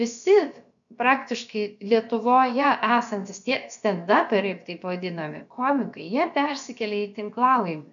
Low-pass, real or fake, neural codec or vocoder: 7.2 kHz; fake; codec, 16 kHz, about 1 kbps, DyCAST, with the encoder's durations